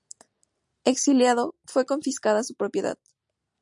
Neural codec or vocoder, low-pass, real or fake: none; 10.8 kHz; real